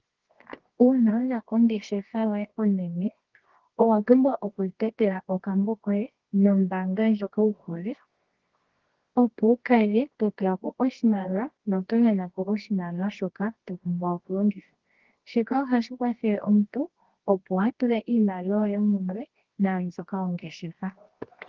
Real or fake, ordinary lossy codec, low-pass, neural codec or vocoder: fake; Opus, 16 kbps; 7.2 kHz; codec, 24 kHz, 0.9 kbps, WavTokenizer, medium music audio release